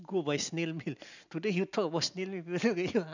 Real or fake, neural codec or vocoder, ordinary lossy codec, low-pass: real; none; none; 7.2 kHz